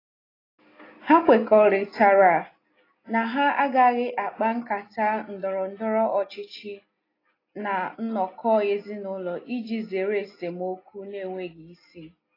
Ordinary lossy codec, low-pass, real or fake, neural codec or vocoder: AAC, 24 kbps; 5.4 kHz; real; none